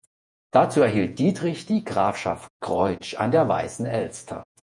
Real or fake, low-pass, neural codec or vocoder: fake; 10.8 kHz; vocoder, 48 kHz, 128 mel bands, Vocos